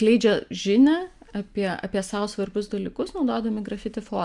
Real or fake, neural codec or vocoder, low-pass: real; none; 10.8 kHz